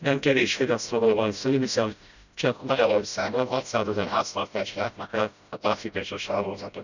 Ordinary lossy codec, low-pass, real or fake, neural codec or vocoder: none; 7.2 kHz; fake; codec, 16 kHz, 0.5 kbps, FreqCodec, smaller model